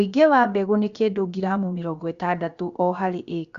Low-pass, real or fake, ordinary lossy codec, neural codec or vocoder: 7.2 kHz; fake; Opus, 64 kbps; codec, 16 kHz, about 1 kbps, DyCAST, with the encoder's durations